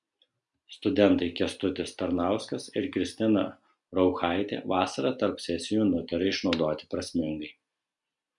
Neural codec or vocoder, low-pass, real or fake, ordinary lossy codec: none; 10.8 kHz; real; MP3, 96 kbps